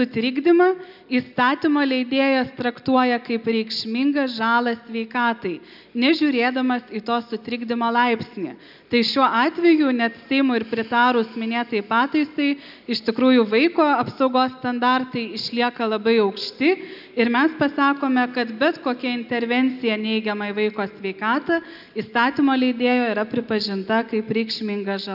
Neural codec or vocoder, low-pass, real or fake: none; 5.4 kHz; real